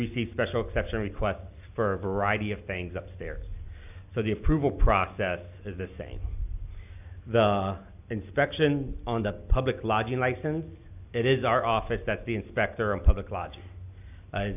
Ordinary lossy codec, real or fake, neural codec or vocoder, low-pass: AAC, 32 kbps; real; none; 3.6 kHz